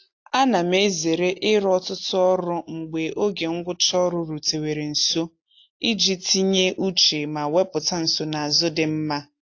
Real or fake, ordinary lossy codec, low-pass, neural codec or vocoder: real; AAC, 48 kbps; 7.2 kHz; none